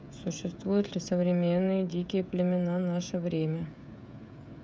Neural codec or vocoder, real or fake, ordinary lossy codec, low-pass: codec, 16 kHz, 16 kbps, FreqCodec, smaller model; fake; none; none